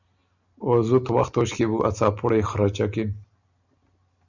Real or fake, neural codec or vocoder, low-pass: real; none; 7.2 kHz